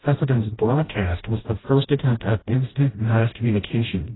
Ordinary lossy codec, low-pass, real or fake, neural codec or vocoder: AAC, 16 kbps; 7.2 kHz; fake; codec, 16 kHz, 0.5 kbps, FreqCodec, smaller model